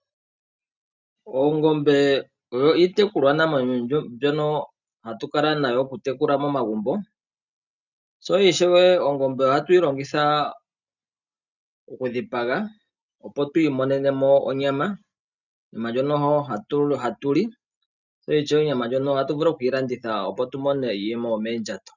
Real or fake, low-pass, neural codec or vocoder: real; 7.2 kHz; none